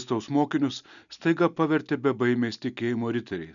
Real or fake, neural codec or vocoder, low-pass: real; none; 7.2 kHz